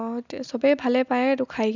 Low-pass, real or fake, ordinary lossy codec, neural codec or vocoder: 7.2 kHz; real; none; none